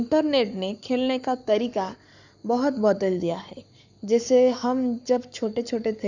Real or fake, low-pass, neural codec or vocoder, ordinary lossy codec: fake; 7.2 kHz; codec, 44.1 kHz, 7.8 kbps, Pupu-Codec; none